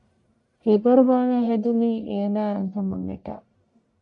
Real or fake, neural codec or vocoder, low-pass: fake; codec, 44.1 kHz, 1.7 kbps, Pupu-Codec; 10.8 kHz